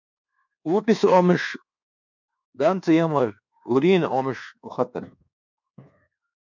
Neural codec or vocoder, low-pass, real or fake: codec, 24 kHz, 1.2 kbps, DualCodec; 7.2 kHz; fake